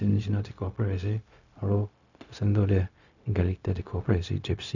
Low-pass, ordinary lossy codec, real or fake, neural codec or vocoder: 7.2 kHz; none; fake; codec, 16 kHz, 0.4 kbps, LongCat-Audio-Codec